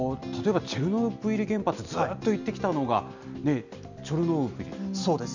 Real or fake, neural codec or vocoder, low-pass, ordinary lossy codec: real; none; 7.2 kHz; none